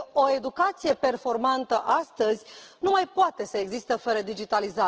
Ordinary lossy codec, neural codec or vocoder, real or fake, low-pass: Opus, 16 kbps; none; real; 7.2 kHz